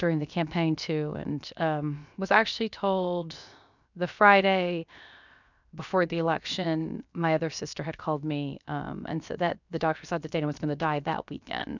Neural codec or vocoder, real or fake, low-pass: codec, 16 kHz, 0.7 kbps, FocalCodec; fake; 7.2 kHz